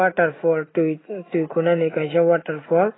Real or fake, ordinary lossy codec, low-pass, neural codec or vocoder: real; AAC, 16 kbps; 7.2 kHz; none